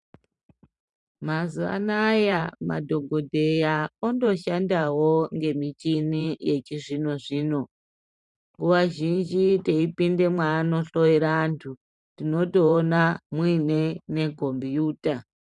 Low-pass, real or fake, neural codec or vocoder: 10.8 kHz; fake; vocoder, 44.1 kHz, 128 mel bands every 512 samples, BigVGAN v2